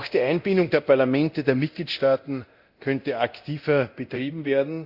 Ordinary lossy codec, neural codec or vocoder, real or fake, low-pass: Opus, 64 kbps; codec, 24 kHz, 0.9 kbps, DualCodec; fake; 5.4 kHz